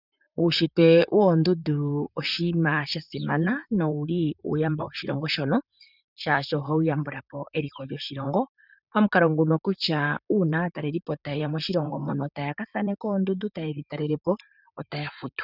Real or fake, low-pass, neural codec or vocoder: fake; 5.4 kHz; vocoder, 44.1 kHz, 128 mel bands, Pupu-Vocoder